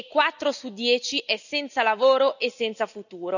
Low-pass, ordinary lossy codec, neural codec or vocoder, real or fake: 7.2 kHz; none; none; real